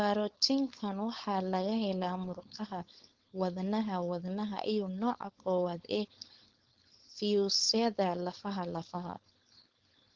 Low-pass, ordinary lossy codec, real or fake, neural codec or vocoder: 7.2 kHz; Opus, 16 kbps; fake; codec, 16 kHz, 4.8 kbps, FACodec